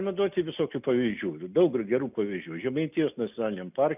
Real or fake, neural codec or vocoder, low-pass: real; none; 3.6 kHz